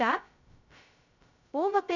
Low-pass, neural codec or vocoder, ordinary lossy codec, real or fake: 7.2 kHz; codec, 16 kHz, 0.2 kbps, FocalCodec; none; fake